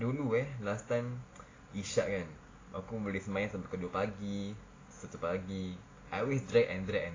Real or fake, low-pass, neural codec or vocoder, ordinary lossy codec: real; 7.2 kHz; none; AAC, 32 kbps